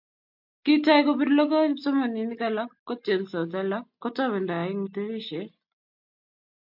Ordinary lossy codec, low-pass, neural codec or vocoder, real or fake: MP3, 48 kbps; 5.4 kHz; none; real